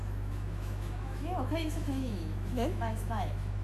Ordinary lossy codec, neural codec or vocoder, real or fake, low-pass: none; autoencoder, 48 kHz, 128 numbers a frame, DAC-VAE, trained on Japanese speech; fake; 14.4 kHz